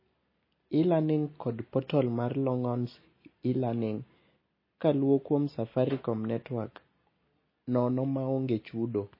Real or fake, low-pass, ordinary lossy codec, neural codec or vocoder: real; 5.4 kHz; MP3, 24 kbps; none